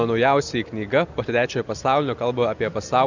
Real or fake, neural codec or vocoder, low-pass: real; none; 7.2 kHz